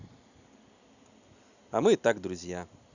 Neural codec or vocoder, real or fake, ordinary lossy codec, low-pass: none; real; none; 7.2 kHz